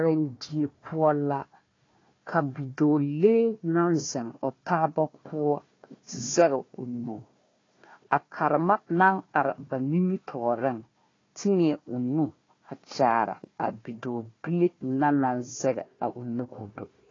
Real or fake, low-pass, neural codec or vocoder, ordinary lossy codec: fake; 7.2 kHz; codec, 16 kHz, 1 kbps, FunCodec, trained on Chinese and English, 50 frames a second; AAC, 32 kbps